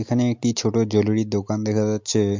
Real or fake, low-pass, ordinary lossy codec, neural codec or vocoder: real; 7.2 kHz; MP3, 64 kbps; none